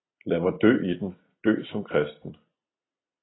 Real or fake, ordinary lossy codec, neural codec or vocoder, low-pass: real; AAC, 16 kbps; none; 7.2 kHz